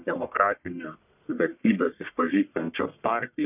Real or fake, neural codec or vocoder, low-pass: fake; codec, 44.1 kHz, 1.7 kbps, Pupu-Codec; 3.6 kHz